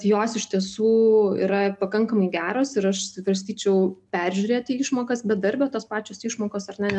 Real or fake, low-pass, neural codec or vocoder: real; 10.8 kHz; none